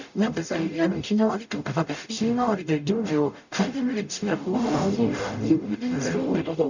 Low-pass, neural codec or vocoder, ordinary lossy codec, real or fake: 7.2 kHz; codec, 44.1 kHz, 0.9 kbps, DAC; none; fake